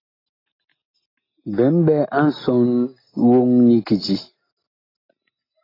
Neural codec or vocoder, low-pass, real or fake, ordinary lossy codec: none; 5.4 kHz; real; AAC, 24 kbps